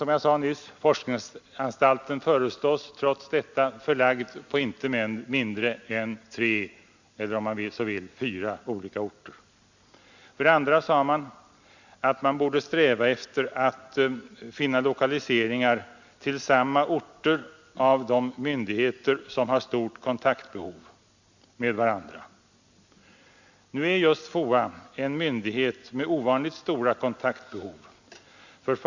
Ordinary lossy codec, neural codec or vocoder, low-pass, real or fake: Opus, 64 kbps; none; 7.2 kHz; real